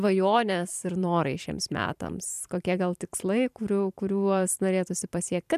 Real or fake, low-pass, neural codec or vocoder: fake; 14.4 kHz; vocoder, 44.1 kHz, 128 mel bands every 512 samples, BigVGAN v2